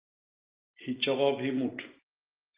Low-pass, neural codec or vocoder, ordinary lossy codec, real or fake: 3.6 kHz; none; Opus, 32 kbps; real